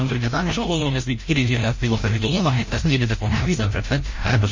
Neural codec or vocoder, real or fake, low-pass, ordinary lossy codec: codec, 16 kHz, 0.5 kbps, FreqCodec, larger model; fake; 7.2 kHz; MP3, 32 kbps